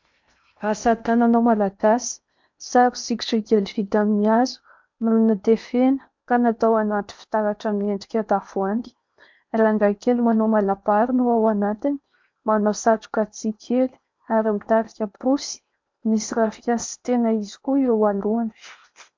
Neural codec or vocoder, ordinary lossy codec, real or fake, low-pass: codec, 16 kHz in and 24 kHz out, 0.8 kbps, FocalCodec, streaming, 65536 codes; MP3, 64 kbps; fake; 7.2 kHz